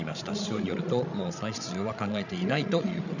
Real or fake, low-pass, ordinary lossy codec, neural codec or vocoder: fake; 7.2 kHz; none; codec, 16 kHz, 16 kbps, FreqCodec, larger model